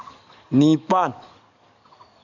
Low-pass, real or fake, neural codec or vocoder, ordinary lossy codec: 7.2 kHz; fake; codec, 44.1 kHz, 7.8 kbps, DAC; none